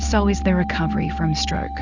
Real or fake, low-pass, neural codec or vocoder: real; 7.2 kHz; none